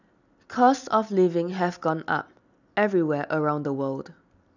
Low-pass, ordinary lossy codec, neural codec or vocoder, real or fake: 7.2 kHz; none; none; real